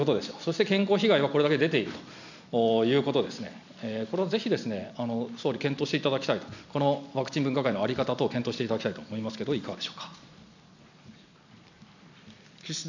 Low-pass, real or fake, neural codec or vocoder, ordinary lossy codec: 7.2 kHz; real; none; none